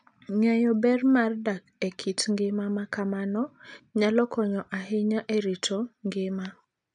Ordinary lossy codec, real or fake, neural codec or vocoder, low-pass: none; real; none; 10.8 kHz